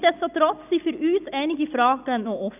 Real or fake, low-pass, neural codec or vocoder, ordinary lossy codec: real; 3.6 kHz; none; none